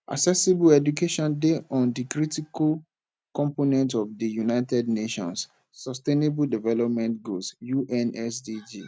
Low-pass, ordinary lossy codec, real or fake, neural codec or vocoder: none; none; real; none